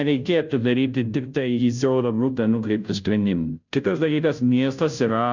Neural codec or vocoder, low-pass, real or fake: codec, 16 kHz, 0.5 kbps, FunCodec, trained on Chinese and English, 25 frames a second; 7.2 kHz; fake